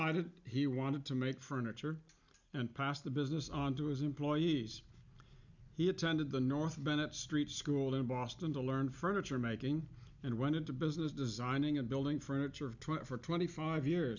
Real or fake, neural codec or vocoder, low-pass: real; none; 7.2 kHz